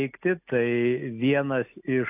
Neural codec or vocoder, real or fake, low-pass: none; real; 3.6 kHz